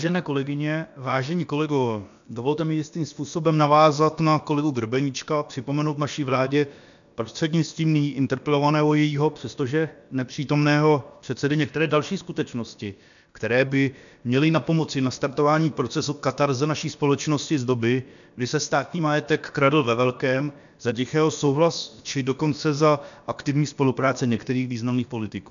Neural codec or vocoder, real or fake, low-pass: codec, 16 kHz, about 1 kbps, DyCAST, with the encoder's durations; fake; 7.2 kHz